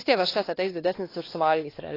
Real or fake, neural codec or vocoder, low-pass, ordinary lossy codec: fake; codec, 16 kHz, 2 kbps, X-Codec, WavLM features, trained on Multilingual LibriSpeech; 5.4 kHz; AAC, 24 kbps